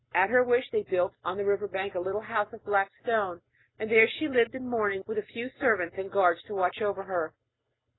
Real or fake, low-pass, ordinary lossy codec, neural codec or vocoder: real; 7.2 kHz; AAC, 16 kbps; none